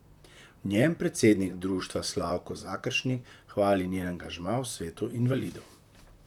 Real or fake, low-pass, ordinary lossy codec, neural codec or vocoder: fake; 19.8 kHz; none; vocoder, 44.1 kHz, 128 mel bands, Pupu-Vocoder